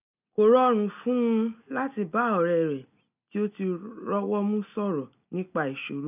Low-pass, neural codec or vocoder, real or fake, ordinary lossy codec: 3.6 kHz; none; real; none